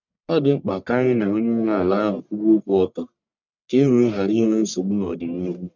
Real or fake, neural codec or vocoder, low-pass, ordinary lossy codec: fake; codec, 44.1 kHz, 1.7 kbps, Pupu-Codec; 7.2 kHz; none